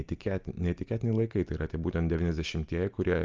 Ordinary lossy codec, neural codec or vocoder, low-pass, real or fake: Opus, 24 kbps; none; 7.2 kHz; real